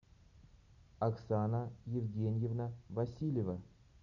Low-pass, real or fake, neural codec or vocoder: 7.2 kHz; real; none